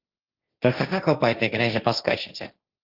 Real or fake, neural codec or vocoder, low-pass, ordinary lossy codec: fake; codec, 16 kHz, 1.1 kbps, Voila-Tokenizer; 5.4 kHz; Opus, 32 kbps